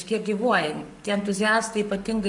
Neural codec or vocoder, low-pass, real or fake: codec, 44.1 kHz, 7.8 kbps, Pupu-Codec; 10.8 kHz; fake